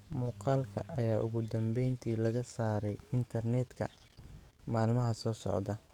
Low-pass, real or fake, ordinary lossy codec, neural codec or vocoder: 19.8 kHz; fake; none; codec, 44.1 kHz, 7.8 kbps, DAC